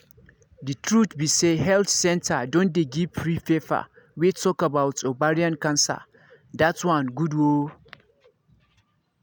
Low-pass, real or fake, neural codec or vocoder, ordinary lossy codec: none; real; none; none